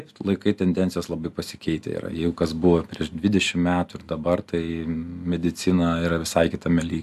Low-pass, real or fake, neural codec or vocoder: 14.4 kHz; real; none